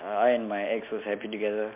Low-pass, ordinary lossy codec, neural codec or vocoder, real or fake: 3.6 kHz; MP3, 32 kbps; none; real